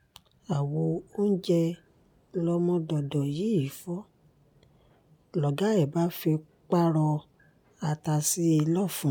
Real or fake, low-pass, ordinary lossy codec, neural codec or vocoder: real; none; none; none